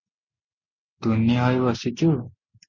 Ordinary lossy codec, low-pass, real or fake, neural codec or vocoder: Opus, 64 kbps; 7.2 kHz; real; none